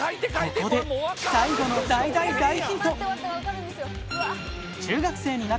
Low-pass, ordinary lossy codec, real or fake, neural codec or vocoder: none; none; real; none